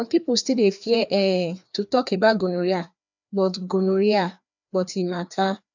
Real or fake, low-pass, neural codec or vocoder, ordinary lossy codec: fake; 7.2 kHz; codec, 16 kHz, 2 kbps, FreqCodec, larger model; none